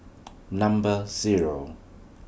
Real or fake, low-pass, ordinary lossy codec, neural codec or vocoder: real; none; none; none